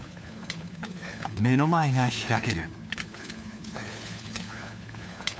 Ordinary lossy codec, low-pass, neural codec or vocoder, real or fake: none; none; codec, 16 kHz, 4 kbps, FunCodec, trained on LibriTTS, 50 frames a second; fake